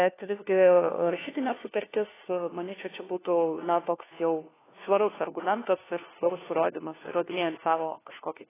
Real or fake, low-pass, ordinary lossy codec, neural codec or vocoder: fake; 3.6 kHz; AAC, 16 kbps; codec, 16 kHz, 1 kbps, FunCodec, trained on LibriTTS, 50 frames a second